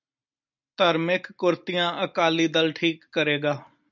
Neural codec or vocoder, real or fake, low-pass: none; real; 7.2 kHz